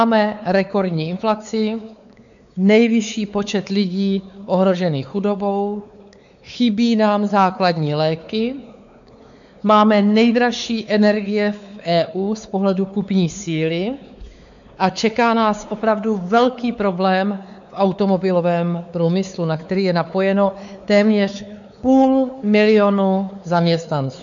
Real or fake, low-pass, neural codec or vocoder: fake; 7.2 kHz; codec, 16 kHz, 4 kbps, X-Codec, WavLM features, trained on Multilingual LibriSpeech